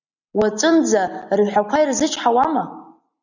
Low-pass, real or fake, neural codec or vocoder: 7.2 kHz; real; none